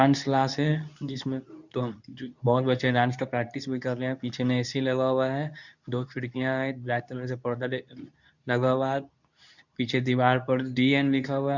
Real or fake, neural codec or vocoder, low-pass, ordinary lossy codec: fake; codec, 24 kHz, 0.9 kbps, WavTokenizer, medium speech release version 2; 7.2 kHz; none